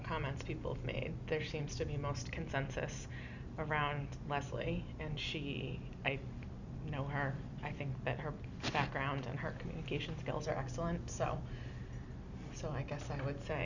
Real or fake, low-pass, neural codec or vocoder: real; 7.2 kHz; none